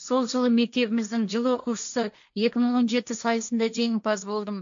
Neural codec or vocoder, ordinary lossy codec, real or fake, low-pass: codec, 16 kHz, 1.1 kbps, Voila-Tokenizer; AAC, 64 kbps; fake; 7.2 kHz